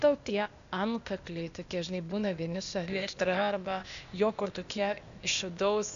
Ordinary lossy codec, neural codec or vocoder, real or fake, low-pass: AAC, 96 kbps; codec, 16 kHz, 0.8 kbps, ZipCodec; fake; 7.2 kHz